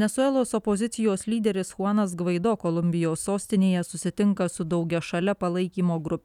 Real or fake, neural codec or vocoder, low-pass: real; none; 19.8 kHz